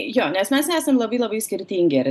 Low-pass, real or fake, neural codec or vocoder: 14.4 kHz; real; none